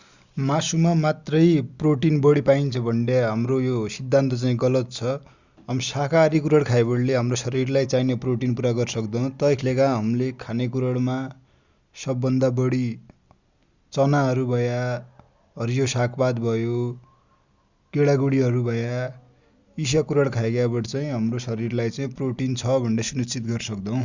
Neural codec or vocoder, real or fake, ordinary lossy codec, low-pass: none; real; Opus, 64 kbps; 7.2 kHz